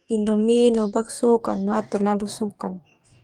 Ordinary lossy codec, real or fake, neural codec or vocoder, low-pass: Opus, 32 kbps; fake; codec, 44.1 kHz, 2.6 kbps, DAC; 19.8 kHz